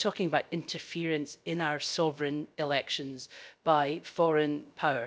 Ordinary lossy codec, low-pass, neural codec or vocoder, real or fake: none; none; codec, 16 kHz, about 1 kbps, DyCAST, with the encoder's durations; fake